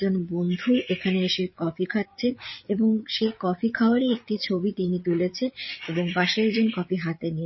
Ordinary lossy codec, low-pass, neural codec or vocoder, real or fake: MP3, 24 kbps; 7.2 kHz; codec, 16 kHz, 8 kbps, FreqCodec, larger model; fake